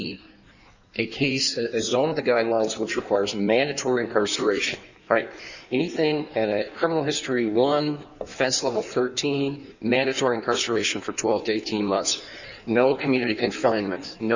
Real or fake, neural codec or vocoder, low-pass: fake; codec, 16 kHz in and 24 kHz out, 1.1 kbps, FireRedTTS-2 codec; 7.2 kHz